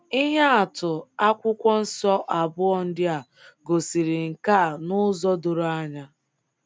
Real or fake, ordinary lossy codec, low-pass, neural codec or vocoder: real; none; none; none